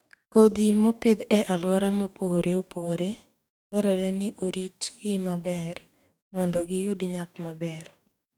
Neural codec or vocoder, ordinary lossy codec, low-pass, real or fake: codec, 44.1 kHz, 2.6 kbps, DAC; none; 19.8 kHz; fake